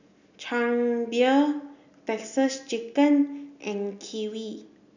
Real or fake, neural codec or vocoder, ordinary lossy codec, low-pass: real; none; none; 7.2 kHz